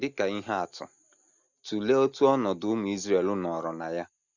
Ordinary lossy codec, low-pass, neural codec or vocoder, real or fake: none; 7.2 kHz; none; real